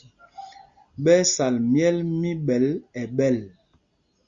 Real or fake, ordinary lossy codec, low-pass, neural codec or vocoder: real; Opus, 64 kbps; 7.2 kHz; none